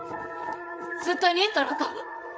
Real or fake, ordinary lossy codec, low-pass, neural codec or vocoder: fake; none; none; codec, 16 kHz, 8 kbps, FreqCodec, larger model